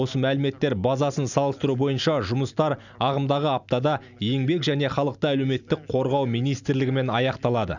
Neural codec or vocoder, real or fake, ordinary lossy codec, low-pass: none; real; none; 7.2 kHz